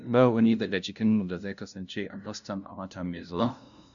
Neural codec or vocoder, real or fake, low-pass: codec, 16 kHz, 0.5 kbps, FunCodec, trained on LibriTTS, 25 frames a second; fake; 7.2 kHz